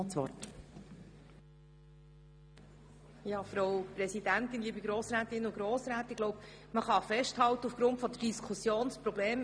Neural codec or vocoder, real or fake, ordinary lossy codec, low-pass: none; real; none; 9.9 kHz